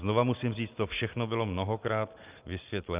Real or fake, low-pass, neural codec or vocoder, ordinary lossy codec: real; 3.6 kHz; none; Opus, 24 kbps